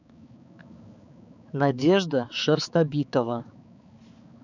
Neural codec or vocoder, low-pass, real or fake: codec, 16 kHz, 4 kbps, X-Codec, HuBERT features, trained on balanced general audio; 7.2 kHz; fake